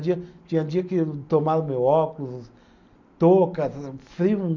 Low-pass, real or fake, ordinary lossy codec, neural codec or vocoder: 7.2 kHz; real; none; none